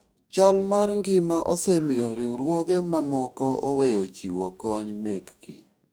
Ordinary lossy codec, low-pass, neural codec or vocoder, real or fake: none; none; codec, 44.1 kHz, 2.6 kbps, DAC; fake